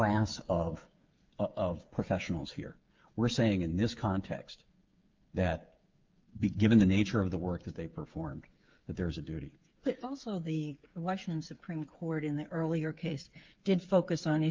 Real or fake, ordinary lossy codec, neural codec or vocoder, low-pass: fake; Opus, 24 kbps; codec, 16 kHz, 8 kbps, FreqCodec, smaller model; 7.2 kHz